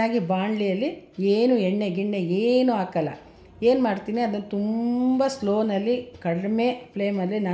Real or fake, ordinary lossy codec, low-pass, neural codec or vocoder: real; none; none; none